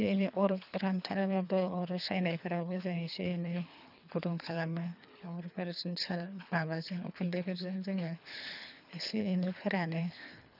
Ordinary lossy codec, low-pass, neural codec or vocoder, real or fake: none; 5.4 kHz; codec, 24 kHz, 3 kbps, HILCodec; fake